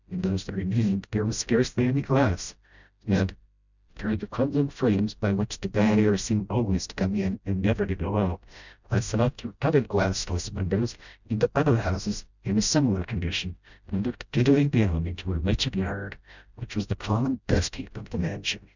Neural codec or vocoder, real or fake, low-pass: codec, 16 kHz, 0.5 kbps, FreqCodec, smaller model; fake; 7.2 kHz